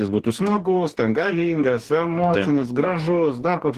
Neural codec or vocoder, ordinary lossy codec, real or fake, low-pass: codec, 44.1 kHz, 2.6 kbps, DAC; Opus, 16 kbps; fake; 14.4 kHz